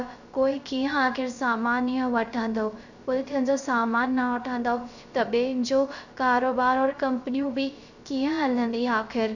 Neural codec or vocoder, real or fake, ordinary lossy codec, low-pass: codec, 16 kHz, 0.3 kbps, FocalCodec; fake; none; 7.2 kHz